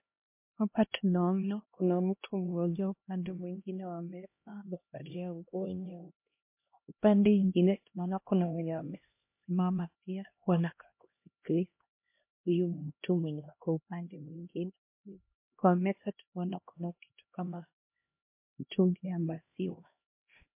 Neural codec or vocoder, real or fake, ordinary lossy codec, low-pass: codec, 16 kHz, 1 kbps, X-Codec, HuBERT features, trained on LibriSpeech; fake; MP3, 24 kbps; 3.6 kHz